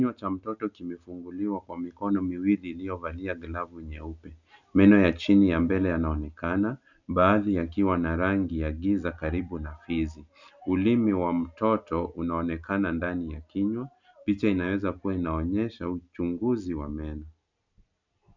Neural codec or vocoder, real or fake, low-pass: none; real; 7.2 kHz